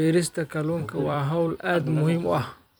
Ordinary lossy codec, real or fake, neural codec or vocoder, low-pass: none; fake; vocoder, 44.1 kHz, 128 mel bands every 256 samples, BigVGAN v2; none